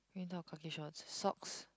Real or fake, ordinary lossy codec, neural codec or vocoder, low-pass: real; none; none; none